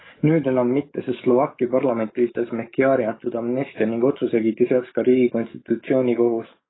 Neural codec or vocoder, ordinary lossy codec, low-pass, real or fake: codec, 44.1 kHz, 7.8 kbps, DAC; AAC, 16 kbps; 7.2 kHz; fake